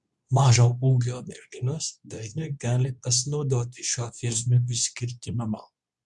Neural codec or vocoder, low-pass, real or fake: codec, 24 kHz, 0.9 kbps, WavTokenizer, medium speech release version 2; 10.8 kHz; fake